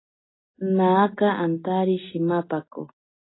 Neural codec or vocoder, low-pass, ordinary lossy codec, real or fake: none; 7.2 kHz; AAC, 16 kbps; real